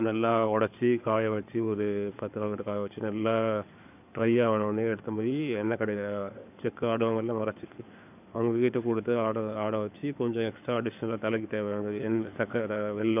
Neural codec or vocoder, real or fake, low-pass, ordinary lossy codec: codec, 16 kHz in and 24 kHz out, 2.2 kbps, FireRedTTS-2 codec; fake; 3.6 kHz; none